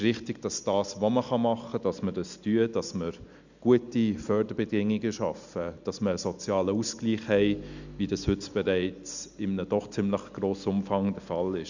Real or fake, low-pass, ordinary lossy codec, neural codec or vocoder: real; 7.2 kHz; none; none